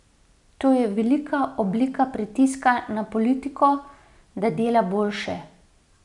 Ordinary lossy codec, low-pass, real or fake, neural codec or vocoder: none; 10.8 kHz; real; none